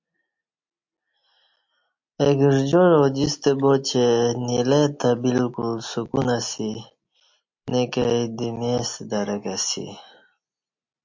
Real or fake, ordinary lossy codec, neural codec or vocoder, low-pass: real; MP3, 48 kbps; none; 7.2 kHz